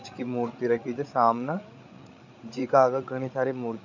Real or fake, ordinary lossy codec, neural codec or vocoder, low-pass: fake; none; codec, 16 kHz, 8 kbps, FreqCodec, larger model; 7.2 kHz